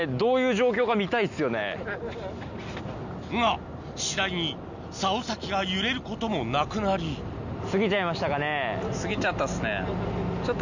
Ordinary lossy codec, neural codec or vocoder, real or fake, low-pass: none; none; real; 7.2 kHz